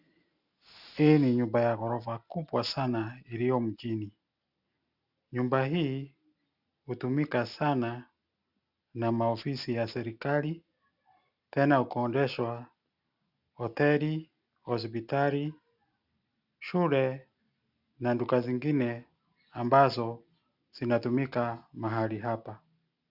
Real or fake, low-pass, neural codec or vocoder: real; 5.4 kHz; none